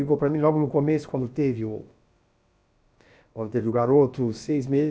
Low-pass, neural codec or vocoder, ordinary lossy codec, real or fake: none; codec, 16 kHz, about 1 kbps, DyCAST, with the encoder's durations; none; fake